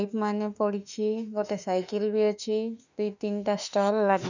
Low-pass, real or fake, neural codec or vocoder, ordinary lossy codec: 7.2 kHz; fake; autoencoder, 48 kHz, 32 numbers a frame, DAC-VAE, trained on Japanese speech; none